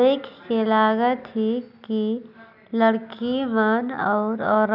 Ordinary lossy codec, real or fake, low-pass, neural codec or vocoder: none; real; 5.4 kHz; none